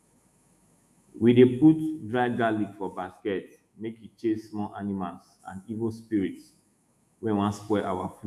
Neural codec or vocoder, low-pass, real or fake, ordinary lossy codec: autoencoder, 48 kHz, 128 numbers a frame, DAC-VAE, trained on Japanese speech; 14.4 kHz; fake; none